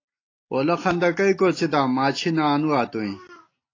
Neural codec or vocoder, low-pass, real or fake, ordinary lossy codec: none; 7.2 kHz; real; AAC, 32 kbps